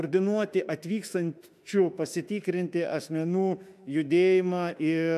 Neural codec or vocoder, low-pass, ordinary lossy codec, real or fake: autoencoder, 48 kHz, 32 numbers a frame, DAC-VAE, trained on Japanese speech; 14.4 kHz; AAC, 96 kbps; fake